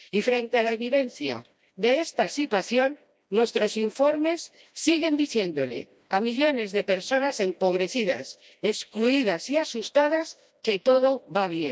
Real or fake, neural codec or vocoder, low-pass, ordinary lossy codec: fake; codec, 16 kHz, 1 kbps, FreqCodec, smaller model; none; none